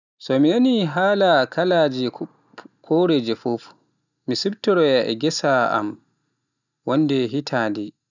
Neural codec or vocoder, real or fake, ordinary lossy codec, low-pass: none; real; none; 7.2 kHz